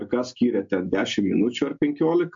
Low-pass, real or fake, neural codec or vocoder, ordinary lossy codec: 7.2 kHz; real; none; MP3, 48 kbps